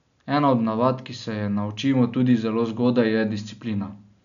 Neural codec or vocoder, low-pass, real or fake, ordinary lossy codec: none; 7.2 kHz; real; none